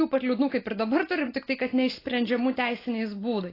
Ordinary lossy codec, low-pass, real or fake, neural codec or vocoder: AAC, 24 kbps; 5.4 kHz; real; none